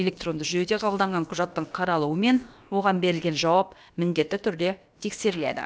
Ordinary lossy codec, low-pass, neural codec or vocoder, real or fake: none; none; codec, 16 kHz, about 1 kbps, DyCAST, with the encoder's durations; fake